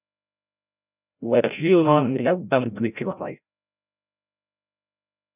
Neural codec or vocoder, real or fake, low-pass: codec, 16 kHz, 0.5 kbps, FreqCodec, larger model; fake; 3.6 kHz